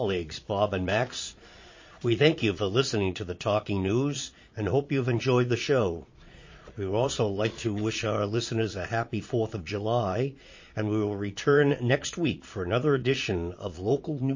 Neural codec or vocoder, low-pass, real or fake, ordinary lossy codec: vocoder, 22.05 kHz, 80 mel bands, Vocos; 7.2 kHz; fake; MP3, 32 kbps